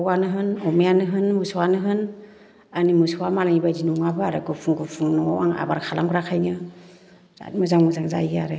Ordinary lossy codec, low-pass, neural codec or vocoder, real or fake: none; none; none; real